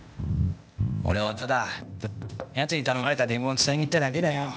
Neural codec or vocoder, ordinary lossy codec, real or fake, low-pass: codec, 16 kHz, 0.8 kbps, ZipCodec; none; fake; none